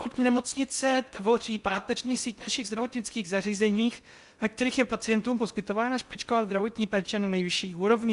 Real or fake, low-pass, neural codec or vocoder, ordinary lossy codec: fake; 10.8 kHz; codec, 16 kHz in and 24 kHz out, 0.6 kbps, FocalCodec, streaming, 4096 codes; Opus, 64 kbps